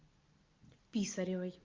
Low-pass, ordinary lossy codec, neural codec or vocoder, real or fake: 7.2 kHz; Opus, 32 kbps; none; real